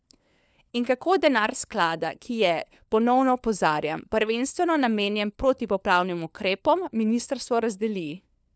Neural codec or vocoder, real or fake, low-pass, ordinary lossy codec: codec, 16 kHz, 4 kbps, FunCodec, trained on LibriTTS, 50 frames a second; fake; none; none